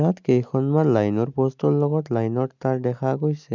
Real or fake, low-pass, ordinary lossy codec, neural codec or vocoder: real; 7.2 kHz; none; none